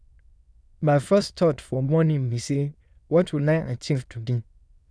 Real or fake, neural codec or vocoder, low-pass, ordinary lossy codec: fake; autoencoder, 22.05 kHz, a latent of 192 numbers a frame, VITS, trained on many speakers; none; none